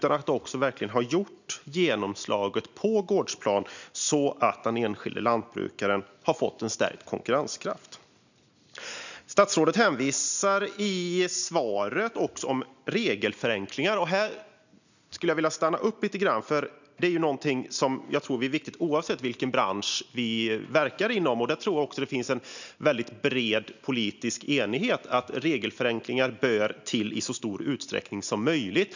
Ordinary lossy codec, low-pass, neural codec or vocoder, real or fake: none; 7.2 kHz; none; real